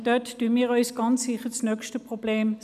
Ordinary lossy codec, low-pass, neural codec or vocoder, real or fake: none; 14.4 kHz; none; real